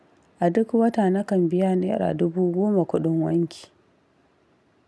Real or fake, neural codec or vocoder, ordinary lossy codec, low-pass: real; none; none; none